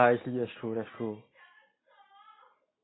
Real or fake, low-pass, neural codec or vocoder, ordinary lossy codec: real; 7.2 kHz; none; AAC, 16 kbps